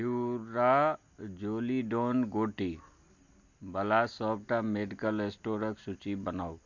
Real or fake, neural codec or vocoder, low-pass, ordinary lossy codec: real; none; 7.2 kHz; MP3, 48 kbps